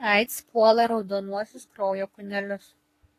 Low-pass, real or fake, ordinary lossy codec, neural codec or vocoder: 14.4 kHz; fake; AAC, 48 kbps; codec, 44.1 kHz, 3.4 kbps, Pupu-Codec